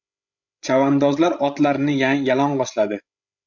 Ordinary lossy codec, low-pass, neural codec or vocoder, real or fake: MP3, 64 kbps; 7.2 kHz; codec, 16 kHz, 16 kbps, FreqCodec, larger model; fake